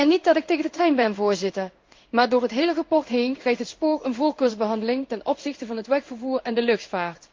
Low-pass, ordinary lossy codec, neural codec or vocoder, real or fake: 7.2 kHz; Opus, 32 kbps; codec, 16 kHz in and 24 kHz out, 1 kbps, XY-Tokenizer; fake